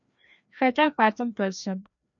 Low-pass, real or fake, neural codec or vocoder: 7.2 kHz; fake; codec, 16 kHz, 1 kbps, FreqCodec, larger model